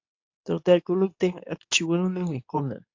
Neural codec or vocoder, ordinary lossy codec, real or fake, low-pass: codec, 24 kHz, 0.9 kbps, WavTokenizer, medium speech release version 2; AAC, 48 kbps; fake; 7.2 kHz